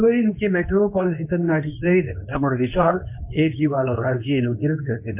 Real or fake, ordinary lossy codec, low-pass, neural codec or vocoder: fake; MP3, 32 kbps; 3.6 kHz; codec, 24 kHz, 0.9 kbps, WavTokenizer, medium speech release version 2